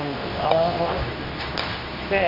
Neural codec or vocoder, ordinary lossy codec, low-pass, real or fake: codec, 24 kHz, 0.9 kbps, WavTokenizer, medium speech release version 2; none; 5.4 kHz; fake